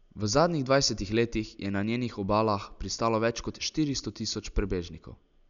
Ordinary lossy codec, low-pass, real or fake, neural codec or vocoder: MP3, 96 kbps; 7.2 kHz; real; none